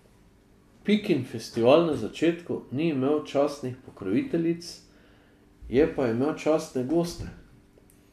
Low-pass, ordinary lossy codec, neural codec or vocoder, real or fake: 14.4 kHz; MP3, 96 kbps; none; real